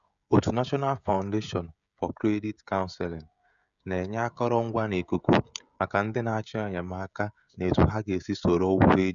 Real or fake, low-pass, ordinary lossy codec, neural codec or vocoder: fake; 7.2 kHz; MP3, 96 kbps; codec, 16 kHz, 16 kbps, FreqCodec, smaller model